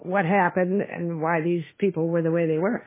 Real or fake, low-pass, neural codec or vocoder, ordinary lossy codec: fake; 3.6 kHz; autoencoder, 48 kHz, 32 numbers a frame, DAC-VAE, trained on Japanese speech; MP3, 16 kbps